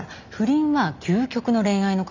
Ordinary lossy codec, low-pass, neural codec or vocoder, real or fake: none; 7.2 kHz; none; real